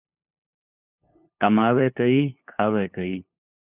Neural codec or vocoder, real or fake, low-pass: codec, 16 kHz, 2 kbps, FunCodec, trained on LibriTTS, 25 frames a second; fake; 3.6 kHz